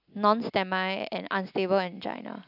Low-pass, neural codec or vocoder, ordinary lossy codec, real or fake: 5.4 kHz; none; none; real